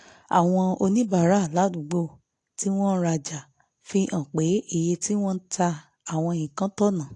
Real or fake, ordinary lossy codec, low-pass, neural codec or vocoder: real; AAC, 48 kbps; 10.8 kHz; none